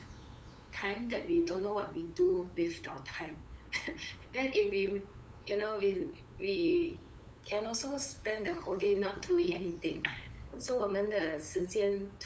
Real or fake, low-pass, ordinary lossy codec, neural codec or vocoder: fake; none; none; codec, 16 kHz, 8 kbps, FunCodec, trained on LibriTTS, 25 frames a second